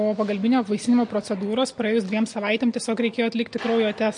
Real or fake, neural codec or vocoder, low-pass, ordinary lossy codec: real; none; 9.9 kHz; MP3, 48 kbps